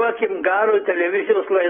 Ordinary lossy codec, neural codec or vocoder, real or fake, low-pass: AAC, 16 kbps; none; real; 19.8 kHz